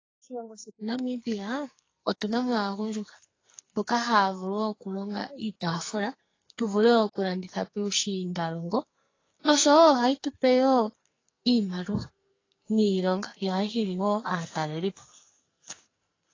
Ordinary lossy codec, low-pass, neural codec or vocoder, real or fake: AAC, 32 kbps; 7.2 kHz; codec, 44.1 kHz, 2.6 kbps, SNAC; fake